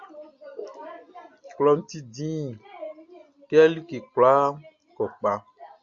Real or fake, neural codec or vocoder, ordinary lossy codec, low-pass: real; none; AAC, 64 kbps; 7.2 kHz